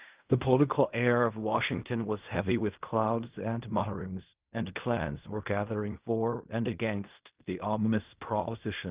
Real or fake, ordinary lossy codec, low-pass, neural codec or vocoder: fake; Opus, 24 kbps; 3.6 kHz; codec, 16 kHz in and 24 kHz out, 0.4 kbps, LongCat-Audio-Codec, fine tuned four codebook decoder